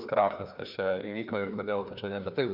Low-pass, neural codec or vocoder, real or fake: 5.4 kHz; codec, 16 kHz, 2 kbps, FreqCodec, larger model; fake